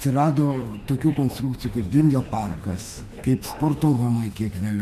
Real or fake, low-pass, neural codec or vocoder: fake; 14.4 kHz; autoencoder, 48 kHz, 32 numbers a frame, DAC-VAE, trained on Japanese speech